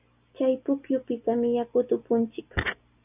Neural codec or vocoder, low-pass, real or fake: none; 3.6 kHz; real